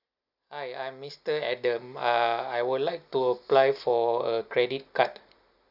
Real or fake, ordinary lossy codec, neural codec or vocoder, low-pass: real; none; none; 5.4 kHz